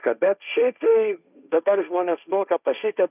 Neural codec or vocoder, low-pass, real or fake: codec, 16 kHz, 1.1 kbps, Voila-Tokenizer; 3.6 kHz; fake